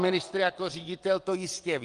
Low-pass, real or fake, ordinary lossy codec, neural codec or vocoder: 9.9 kHz; fake; Opus, 24 kbps; vocoder, 22.05 kHz, 80 mel bands, WaveNeXt